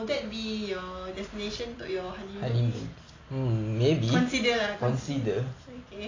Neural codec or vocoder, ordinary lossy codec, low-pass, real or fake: none; AAC, 32 kbps; 7.2 kHz; real